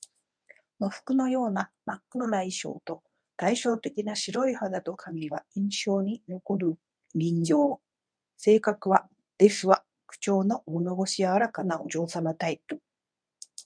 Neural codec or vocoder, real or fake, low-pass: codec, 24 kHz, 0.9 kbps, WavTokenizer, medium speech release version 1; fake; 9.9 kHz